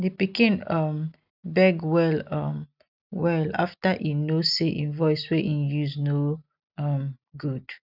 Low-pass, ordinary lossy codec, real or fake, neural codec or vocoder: 5.4 kHz; none; real; none